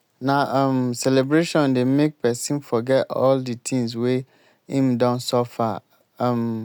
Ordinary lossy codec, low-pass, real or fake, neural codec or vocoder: none; none; real; none